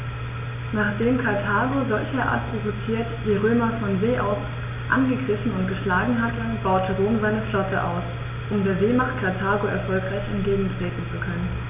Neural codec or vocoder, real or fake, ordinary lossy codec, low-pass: none; real; none; 3.6 kHz